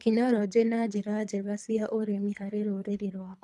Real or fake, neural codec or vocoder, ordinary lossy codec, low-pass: fake; codec, 24 kHz, 3 kbps, HILCodec; none; none